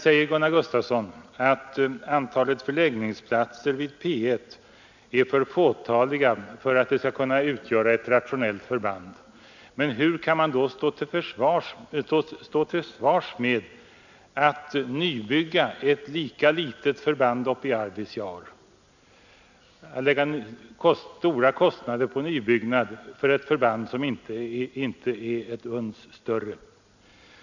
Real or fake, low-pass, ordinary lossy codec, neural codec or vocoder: real; 7.2 kHz; none; none